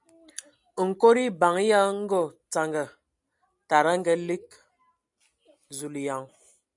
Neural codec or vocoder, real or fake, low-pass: none; real; 10.8 kHz